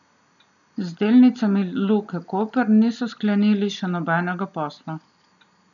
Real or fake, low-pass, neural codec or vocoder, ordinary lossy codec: real; 7.2 kHz; none; none